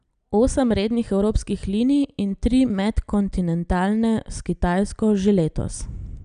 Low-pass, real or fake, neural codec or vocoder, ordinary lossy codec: 9.9 kHz; real; none; none